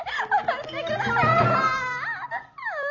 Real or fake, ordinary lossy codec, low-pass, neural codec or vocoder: real; none; 7.2 kHz; none